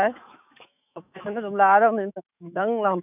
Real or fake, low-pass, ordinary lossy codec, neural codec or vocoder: fake; 3.6 kHz; none; autoencoder, 48 kHz, 128 numbers a frame, DAC-VAE, trained on Japanese speech